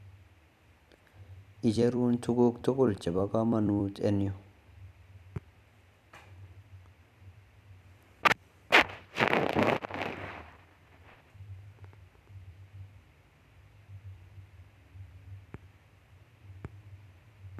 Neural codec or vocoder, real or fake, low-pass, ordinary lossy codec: vocoder, 44.1 kHz, 128 mel bands every 256 samples, BigVGAN v2; fake; 14.4 kHz; none